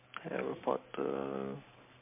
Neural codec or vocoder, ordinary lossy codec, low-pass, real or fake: none; MP3, 24 kbps; 3.6 kHz; real